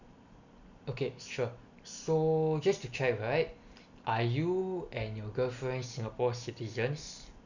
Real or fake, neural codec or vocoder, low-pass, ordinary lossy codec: real; none; 7.2 kHz; none